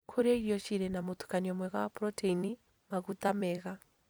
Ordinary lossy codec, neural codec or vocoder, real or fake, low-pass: none; vocoder, 44.1 kHz, 128 mel bands every 256 samples, BigVGAN v2; fake; none